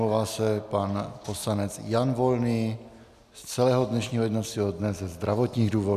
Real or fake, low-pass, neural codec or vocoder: real; 14.4 kHz; none